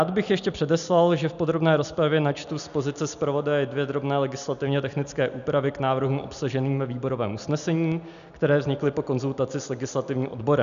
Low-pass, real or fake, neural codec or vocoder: 7.2 kHz; real; none